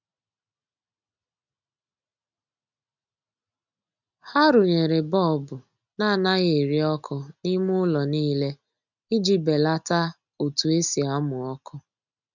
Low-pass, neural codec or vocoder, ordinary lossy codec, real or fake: 7.2 kHz; none; none; real